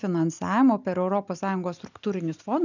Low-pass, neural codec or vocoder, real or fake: 7.2 kHz; none; real